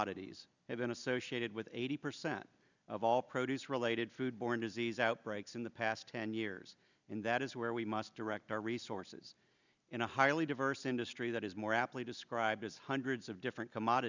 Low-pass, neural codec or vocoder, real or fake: 7.2 kHz; none; real